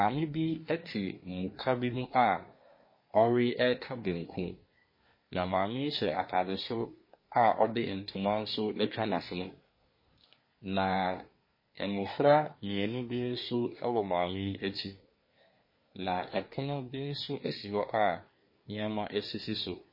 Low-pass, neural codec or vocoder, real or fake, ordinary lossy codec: 5.4 kHz; codec, 24 kHz, 1 kbps, SNAC; fake; MP3, 24 kbps